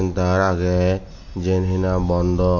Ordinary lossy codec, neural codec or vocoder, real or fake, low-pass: none; none; real; 7.2 kHz